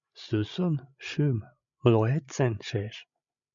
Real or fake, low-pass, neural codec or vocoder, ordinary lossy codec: fake; 7.2 kHz; codec, 16 kHz, 16 kbps, FreqCodec, larger model; MP3, 64 kbps